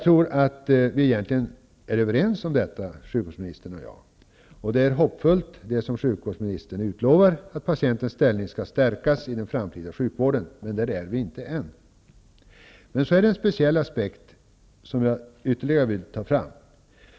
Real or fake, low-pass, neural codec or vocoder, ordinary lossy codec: real; none; none; none